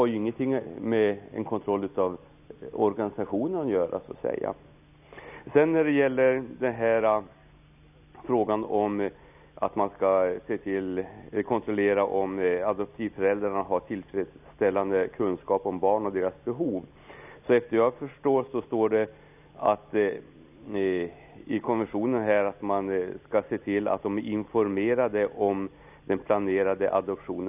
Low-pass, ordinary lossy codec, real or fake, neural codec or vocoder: 3.6 kHz; none; real; none